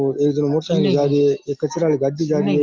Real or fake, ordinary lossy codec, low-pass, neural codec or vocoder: real; Opus, 32 kbps; 7.2 kHz; none